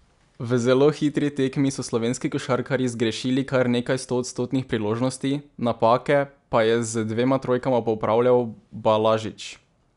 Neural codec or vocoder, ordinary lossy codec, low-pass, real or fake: none; none; 10.8 kHz; real